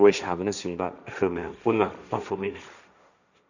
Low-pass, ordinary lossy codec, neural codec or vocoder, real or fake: 7.2 kHz; none; codec, 16 kHz, 1.1 kbps, Voila-Tokenizer; fake